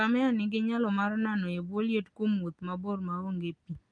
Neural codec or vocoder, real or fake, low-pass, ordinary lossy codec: none; real; 9.9 kHz; Opus, 32 kbps